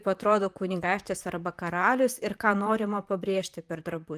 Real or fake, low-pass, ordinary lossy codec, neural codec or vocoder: fake; 14.4 kHz; Opus, 32 kbps; vocoder, 44.1 kHz, 128 mel bands, Pupu-Vocoder